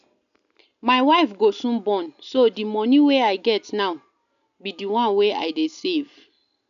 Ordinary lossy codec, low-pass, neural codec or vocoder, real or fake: none; 7.2 kHz; none; real